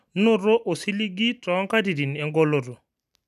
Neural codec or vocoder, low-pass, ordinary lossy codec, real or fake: none; 14.4 kHz; none; real